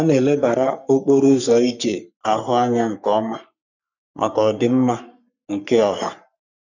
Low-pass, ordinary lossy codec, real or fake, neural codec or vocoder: 7.2 kHz; none; fake; codec, 44.1 kHz, 3.4 kbps, Pupu-Codec